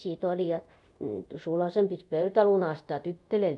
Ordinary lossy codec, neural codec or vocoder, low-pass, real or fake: none; codec, 24 kHz, 0.5 kbps, DualCodec; 10.8 kHz; fake